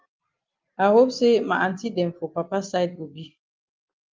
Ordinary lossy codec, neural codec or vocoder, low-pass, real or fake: Opus, 24 kbps; none; 7.2 kHz; real